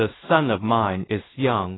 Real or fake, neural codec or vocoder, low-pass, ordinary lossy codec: fake; codec, 16 kHz in and 24 kHz out, 0.4 kbps, LongCat-Audio-Codec, two codebook decoder; 7.2 kHz; AAC, 16 kbps